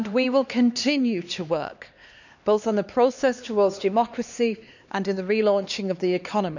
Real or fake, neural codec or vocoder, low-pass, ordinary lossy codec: fake; codec, 16 kHz, 2 kbps, X-Codec, HuBERT features, trained on LibriSpeech; 7.2 kHz; none